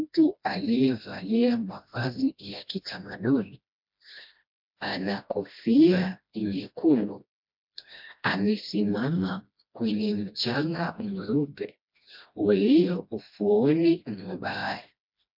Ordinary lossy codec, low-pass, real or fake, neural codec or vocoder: MP3, 48 kbps; 5.4 kHz; fake; codec, 16 kHz, 1 kbps, FreqCodec, smaller model